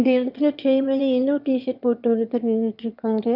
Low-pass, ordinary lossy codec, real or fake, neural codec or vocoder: 5.4 kHz; none; fake; autoencoder, 22.05 kHz, a latent of 192 numbers a frame, VITS, trained on one speaker